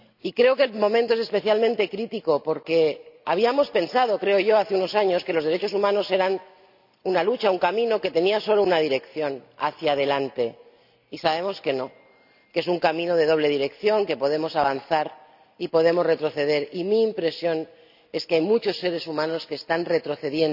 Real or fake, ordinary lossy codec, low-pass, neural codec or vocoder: real; none; 5.4 kHz; none